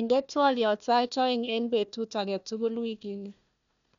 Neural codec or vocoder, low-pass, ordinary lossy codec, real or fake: codec, 16 kHz, 1 kbps, FunCodec, trained on Chinese and English, 50 frames a second; 7.2 kHz; none; fake